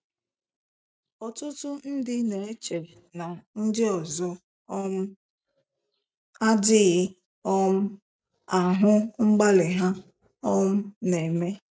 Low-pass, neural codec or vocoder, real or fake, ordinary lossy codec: none; none; real; none